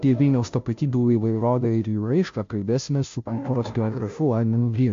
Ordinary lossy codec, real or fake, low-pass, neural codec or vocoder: MP3, 48 kbps; fake; 7.2 kHz; codec, 16 kHz, 0.5 kbps, FunCodec, trained on Chinese and English, 25 frames a second